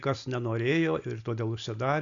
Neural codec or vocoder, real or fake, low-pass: none; real; 7.2 kHz